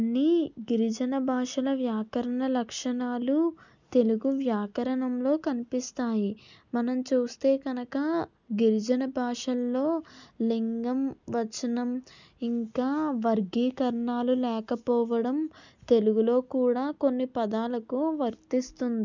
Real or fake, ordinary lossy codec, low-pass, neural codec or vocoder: real; none; 7.2 kHz; none